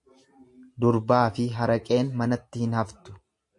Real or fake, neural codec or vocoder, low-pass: real; none; 10.8 kHz